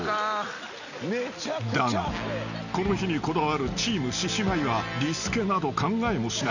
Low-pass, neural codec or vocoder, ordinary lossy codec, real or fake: 7.2 kHz; none; none; real